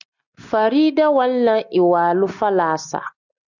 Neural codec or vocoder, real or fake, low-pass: none; real; 7.2 kHz